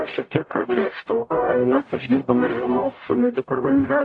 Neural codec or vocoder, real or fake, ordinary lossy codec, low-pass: codec, 44.1 kHz, 0.9 kbps, DAC; fake; AAC, 48 kbps; 9.9 kHz